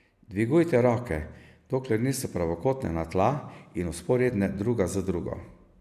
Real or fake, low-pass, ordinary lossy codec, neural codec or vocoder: real; 14.4 kHz; none; none